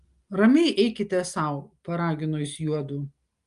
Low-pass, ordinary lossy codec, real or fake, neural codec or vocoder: 10.8 kHz; Opus, 32 kbps; real; none